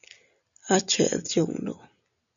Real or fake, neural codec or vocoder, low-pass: real; none; 7.2 kHz